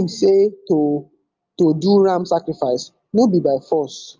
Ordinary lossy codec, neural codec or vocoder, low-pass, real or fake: Opus, 32 kbps; none; 7.2 kHz; real